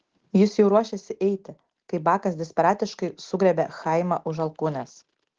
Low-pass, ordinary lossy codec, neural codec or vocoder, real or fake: 7.2 kHz; Opus, 16 kbps; none; real